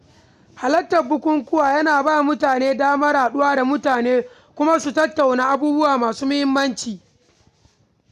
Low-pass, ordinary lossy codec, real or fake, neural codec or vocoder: 14.4 kHz; none; real; none